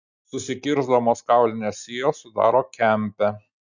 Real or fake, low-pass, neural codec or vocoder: real; 7.2 kHz; none